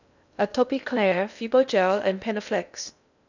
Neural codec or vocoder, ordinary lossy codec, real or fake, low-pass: codec, 16 kHz in and 24 kHz out, 0.6 kbps, FocalCodec, streaming, 2048 codes; none; fake; 7.2 kHz